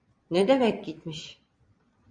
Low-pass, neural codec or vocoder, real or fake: 9.9 kHz; vocoder, 22.05 kHz, 80 mel bands, Vocos; fake